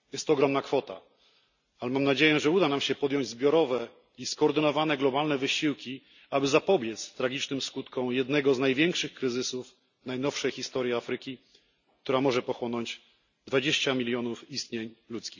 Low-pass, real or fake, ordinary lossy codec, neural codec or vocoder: 7.2 kHz; real; none; none